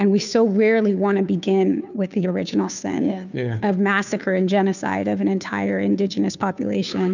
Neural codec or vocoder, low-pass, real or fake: codec, 16 kHz, 2 kbps, FunCodec, trained on Chinese and English, 25 frames a second; 7.2 kHz; fake